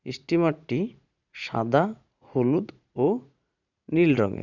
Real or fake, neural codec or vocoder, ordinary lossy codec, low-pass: fake; vocoder, 44.1 kHz, 80 mel bands, Vocos; none; 7.2 kHz